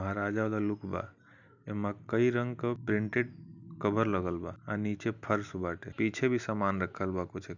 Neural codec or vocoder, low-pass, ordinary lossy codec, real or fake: none; 7.2 kHz; none; real